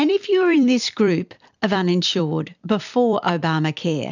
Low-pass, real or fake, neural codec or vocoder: 7.2 kHz; fake; vocoder, 44.1 kHz, 128 mel bands every 256 samples, BigVGAN v2